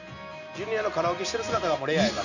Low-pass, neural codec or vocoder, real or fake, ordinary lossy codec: 7.2 kHz; none; real; none